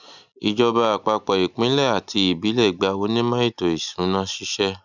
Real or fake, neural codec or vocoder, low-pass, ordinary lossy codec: real; none; 7.2 kHz; none